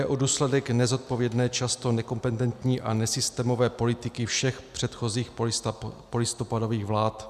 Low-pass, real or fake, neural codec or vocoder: 14.4 kHz; real; none